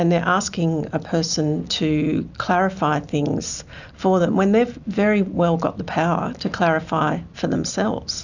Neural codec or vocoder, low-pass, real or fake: none; 7.2 kHz; real